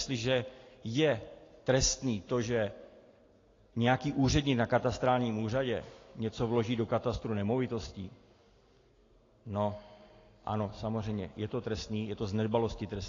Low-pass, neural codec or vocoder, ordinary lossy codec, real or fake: 7.2 kHz; none; AAC, 32 kbps; real